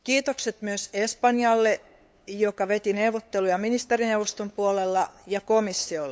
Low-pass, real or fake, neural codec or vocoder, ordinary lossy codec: none; fake; codec, 16 kHz, 8 kbps, FunCodec, trained on LibriTTS, 25 frames a second; none